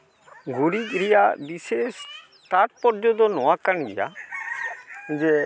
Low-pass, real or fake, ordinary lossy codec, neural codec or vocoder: none; real; none; none